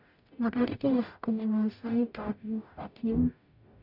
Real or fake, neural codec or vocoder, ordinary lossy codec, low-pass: fake; codec, 44.1 kHz, 0.9 kbps, DAC; none; 5.4 kHz